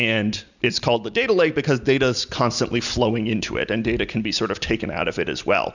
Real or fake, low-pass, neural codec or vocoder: real; 7.2 kHz; none